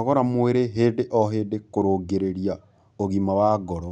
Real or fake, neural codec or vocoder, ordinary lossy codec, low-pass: real; none; none; 9.9 kHz